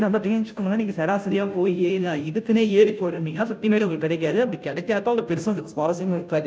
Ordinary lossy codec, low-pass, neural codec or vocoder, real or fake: none; none; codec, 16 kHz, 0.5 kbps, FunCodec, trained on Chinese and English, 25 frames a second; fake